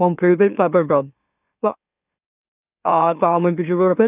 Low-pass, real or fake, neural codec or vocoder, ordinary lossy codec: 3.6 kHz; fake; autoencoder, 44.1 kHz, a latent of 192 numbers a frame, MeloTTS; none